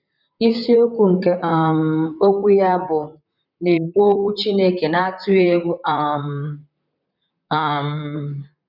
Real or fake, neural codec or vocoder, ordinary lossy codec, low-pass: fake; vocoder, 44.1 kHz, 128 mel bands, Pupu-Vocoder; none; 5.4 kHz